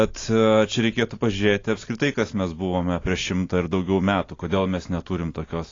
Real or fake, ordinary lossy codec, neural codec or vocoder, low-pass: real; AAC, 32 kbps; none; 7.2 kHz